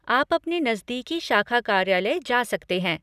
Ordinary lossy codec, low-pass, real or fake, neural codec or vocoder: none; 14.4 kHz; real; none